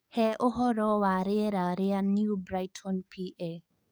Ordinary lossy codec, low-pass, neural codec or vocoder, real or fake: none; none; codec, 44.1 kHz, 7.8 kbps, DAC; fake